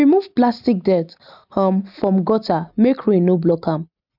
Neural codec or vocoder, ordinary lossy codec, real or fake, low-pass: none; none; real; 5.4 kHz